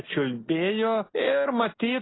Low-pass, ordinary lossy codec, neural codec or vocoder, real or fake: 7.2 kHz; AAC, 16 kbps; none; real